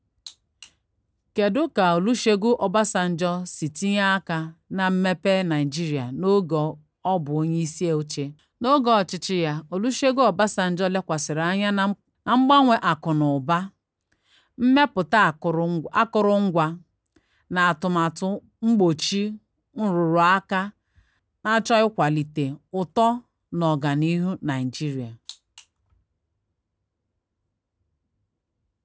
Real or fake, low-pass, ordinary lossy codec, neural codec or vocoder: real; none; none; none